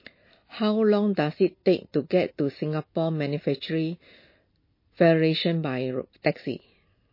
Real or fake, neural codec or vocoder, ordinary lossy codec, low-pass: real; none; MP3, 24 kbps; 5.4 kHz